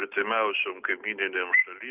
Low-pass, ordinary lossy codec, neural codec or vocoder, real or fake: 3.6 kHz; Opus, 24 kbps; none; real